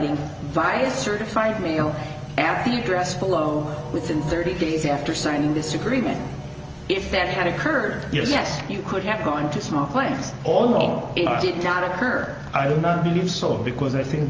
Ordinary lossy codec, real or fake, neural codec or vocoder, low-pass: Opus, 24 kbps; real; none; 7.2 kHz